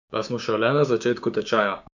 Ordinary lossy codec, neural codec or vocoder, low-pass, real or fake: none; codec, 16 kHz, 6 kbps, DAC; 7.2 kHz; fake